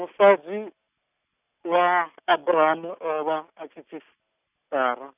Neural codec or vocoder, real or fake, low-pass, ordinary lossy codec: none; real; 3.6 kHz; none